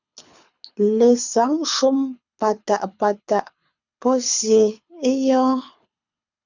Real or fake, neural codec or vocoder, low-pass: fake; codec, 24 kHz, 6 kbps, HILCodec; 7.2 kHz